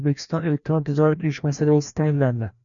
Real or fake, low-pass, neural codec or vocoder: fake; 7.2 kHz; codec, 16 kHz, 1 kbps, FreqCodec, larger model